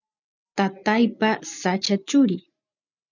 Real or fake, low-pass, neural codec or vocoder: real; 7.2 kHz; none